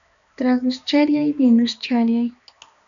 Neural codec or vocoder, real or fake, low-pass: codec, 16 kHz, 2 kbps, X-Codec, HuBERT features, trained on balanced general audio; fake; 7.2 kHz